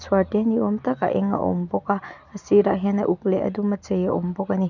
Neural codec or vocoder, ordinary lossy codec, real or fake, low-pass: none; none; real; 7.2 kHz